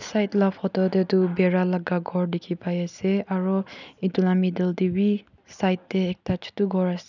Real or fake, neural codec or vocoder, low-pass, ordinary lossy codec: real; none; 7.2 kHz; none